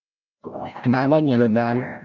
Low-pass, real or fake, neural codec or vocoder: 7.2 kHz; fake; codec, 16 kHz, 0.5 kbps, FreqCodec, larger model